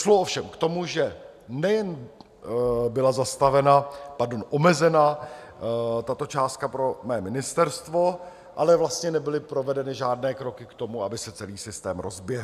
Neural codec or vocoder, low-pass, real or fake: none; 14.4 kHz; real